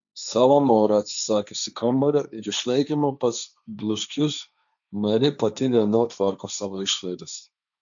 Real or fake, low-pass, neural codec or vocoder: fake; 7.2 kHz; codec, 16 kHz, 1.1 kbps, Voila-Tokenizer